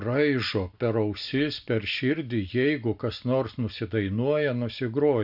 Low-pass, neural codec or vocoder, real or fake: 5.4 kHz; none; real